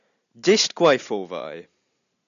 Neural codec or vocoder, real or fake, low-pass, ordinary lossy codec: none; real; 7.2 kHz; MP3, 96 kbps